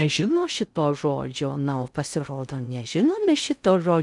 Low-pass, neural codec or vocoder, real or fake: 10.8 kHz; codec, 16 kHz in and 24 kHz out, 0.6 kbps, FocalCodec, streaming, 2048 codes; fake